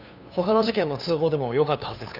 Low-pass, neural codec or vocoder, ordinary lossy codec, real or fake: 5.4 kHz; codec, 16 kHz, 2 kbps, FunCodec, trained on LibriTTS, 25 frames a second; none; fake